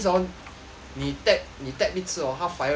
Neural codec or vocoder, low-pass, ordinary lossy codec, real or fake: none; none; none; real